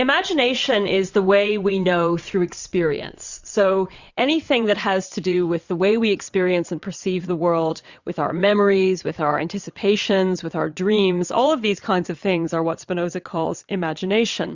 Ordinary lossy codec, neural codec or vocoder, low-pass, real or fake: Opus, 64 kbps; vocoder, 22.05 kHz, 80 mel bands, WaveNeXt; 7.2 kHz; fake